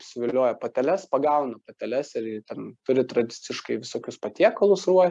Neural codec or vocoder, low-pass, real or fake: none; 10.8 kHz; real